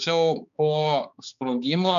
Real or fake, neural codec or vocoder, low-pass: fake; codec, 16 kHz, 4 kbps, X-Codec, HuBERT features, trained on balanced general audio; 7.2 kHz